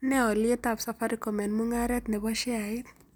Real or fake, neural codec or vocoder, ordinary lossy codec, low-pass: real; none; none; none